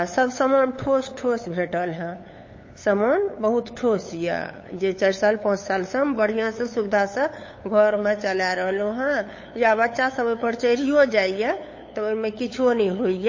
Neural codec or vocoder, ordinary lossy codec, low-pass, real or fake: codec, 16 kHz, 8 kbps, FunCodec, trained on LibriTTS, 25 frames a second; MP3, 32 kbps; 7.2 kHz; fake